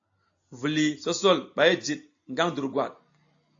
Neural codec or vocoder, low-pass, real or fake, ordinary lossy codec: none; 7.2 kHz; real; AAC, 48 kbps